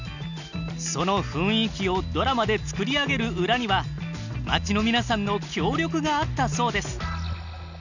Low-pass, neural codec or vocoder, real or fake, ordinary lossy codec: 7.2 kHz; none; real; none